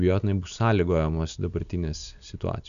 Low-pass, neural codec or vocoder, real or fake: 7.2 kHz; none; real